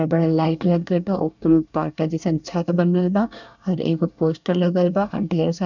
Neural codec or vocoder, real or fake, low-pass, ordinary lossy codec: codec, 24 kHz, 1 kbps, SNAC; fake; 7.2 kHz; none